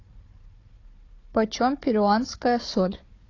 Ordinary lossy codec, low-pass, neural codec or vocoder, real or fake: AAC, 32 kbps; 7.2 kHz; codec, 16 kHz, 4 kbps, FunCodec, trained on Chinese and English, 50 frames a second; fake